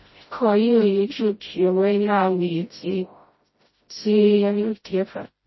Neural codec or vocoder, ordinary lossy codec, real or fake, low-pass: codec, 16 kHz, 0.5 kbps, FreqCodec, smaller model; MP3, 24 kbps; fake; 7.2 kHz